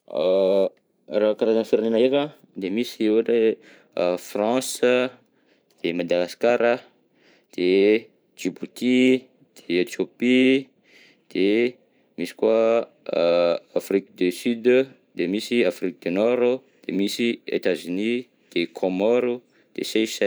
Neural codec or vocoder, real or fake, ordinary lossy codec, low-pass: vocoder, 44.1 kHz, 128 mel bands every 512 samples, BigVGAN v2; fake; none; none